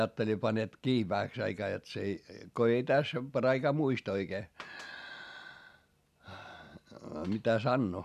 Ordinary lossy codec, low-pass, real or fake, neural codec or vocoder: none; 14.4 kHz; real; none